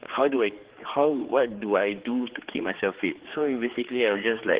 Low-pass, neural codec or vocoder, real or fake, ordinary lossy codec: 3.6 kHz; codec, 16 kHz, 4 kbps, X-Codec, HuBERT features, trained on general audio; fake; Opus, 16 kbps